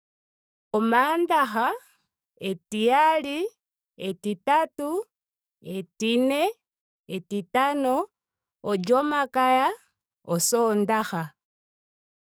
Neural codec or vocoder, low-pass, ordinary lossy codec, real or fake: codec, 44.1 kHz, 7.8 kbps, DAC; none; none; fake